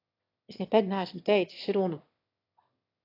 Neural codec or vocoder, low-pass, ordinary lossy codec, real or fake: autoencoder, 22.05 kHz, a latent of 192 numbers a frame, VITS, trained on one speaker; 5.4 kHz; AAC, 32 kbps; fake